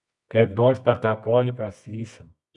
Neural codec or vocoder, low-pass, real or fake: codec, 24 kHz, 0.9 kbps, WavTokenizer, medium music audio release; 10.8 kHz; fake